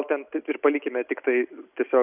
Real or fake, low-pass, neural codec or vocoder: real; 3.6 kHz; none